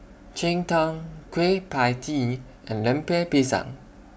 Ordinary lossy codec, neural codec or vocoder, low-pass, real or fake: none; none; none; real